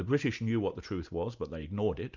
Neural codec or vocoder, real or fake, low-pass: none; real; 7.2 kHz